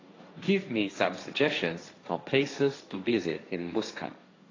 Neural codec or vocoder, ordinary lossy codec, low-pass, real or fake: codec, 16 kHz, 1.1 kbps, Voila-Tokenizer; AAC, 32 kbps; 7.2 kHz; fake